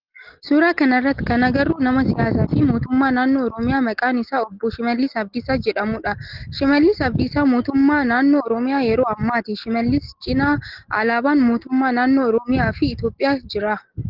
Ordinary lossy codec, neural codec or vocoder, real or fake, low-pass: Opus, 16 kbps; none; real; 5.4 kHz